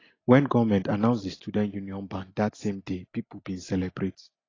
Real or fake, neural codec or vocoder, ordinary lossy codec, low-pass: real; none; AAC, 32 kbps; 7.2 kHz